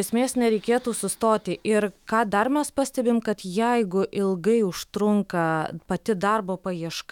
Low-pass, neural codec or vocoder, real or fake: 19.8 kHz; autoencoder, 48 kHz, 128 numbers a frame, DAC-VAE, trained on Japanese speech; fake